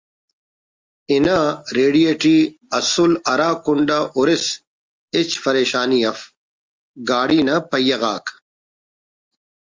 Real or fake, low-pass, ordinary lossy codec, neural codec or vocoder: real; 7.2 kHz; Opus, 32 kbps; none